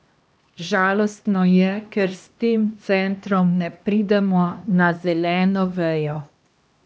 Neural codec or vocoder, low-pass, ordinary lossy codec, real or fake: codec, 16 kHz, 1 kbps, X-Codec, HuBERT features, trained on LibriSpeech; none; none; fake